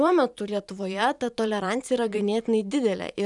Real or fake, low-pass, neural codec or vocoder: fake; 10.8 kHz; vocoder, 44.1 kHz, 128 mel bands every 512 samples, BigVGAN v2